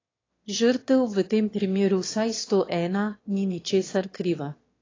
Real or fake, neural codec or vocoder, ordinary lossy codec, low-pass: fake; autoencoder, 22.05 kHz, a latent of 192 numbers a frame, VITS, trained on one speaker; AAC, 32 kbps; 7.2 kHz